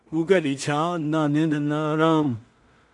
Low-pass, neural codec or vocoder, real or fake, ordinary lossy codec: 10.8 kHz; codec, 16 kHz in and 24 kHz out, 0.4 kbps, LongCat-Audio-Codec, two codebook decoder; fake; MP3, 64 kbps